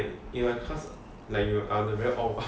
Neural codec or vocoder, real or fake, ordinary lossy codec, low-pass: none; real; none; none